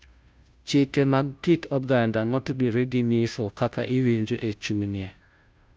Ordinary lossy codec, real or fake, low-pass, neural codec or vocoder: none; fake; none; codec, 16 kHz, 0.5 kbps, FunCodec, trained on Chinese and English, 25 frames a second